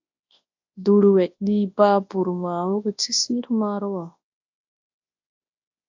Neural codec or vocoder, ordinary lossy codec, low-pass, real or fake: codec, 24 kHz, 0.9 kbps, WavTokenizer, large speech release; AAC, 48 kbps; 7.2 kHz; fake